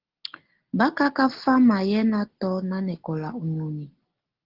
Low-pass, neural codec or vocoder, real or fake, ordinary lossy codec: 5.4 kHz; none; real; Opus, 16 kbps